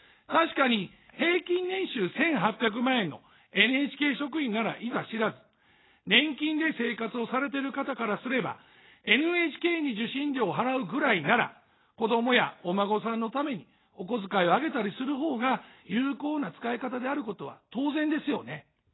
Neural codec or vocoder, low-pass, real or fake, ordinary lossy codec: none; 7.2 kHz; real; AAC, 16 kbps